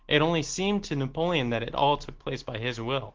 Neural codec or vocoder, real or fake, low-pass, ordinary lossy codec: none; real; 7.2 kHz; Opus, 16 kbps